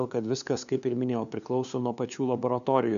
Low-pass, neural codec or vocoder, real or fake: 7.2 kHz; codec, 16 kHz, 2 kbps, FunCodec, trained on LibriTTS, 25 frames a second; fake